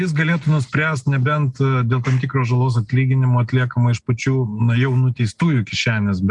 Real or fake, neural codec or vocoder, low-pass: real; none; 10.8 kHz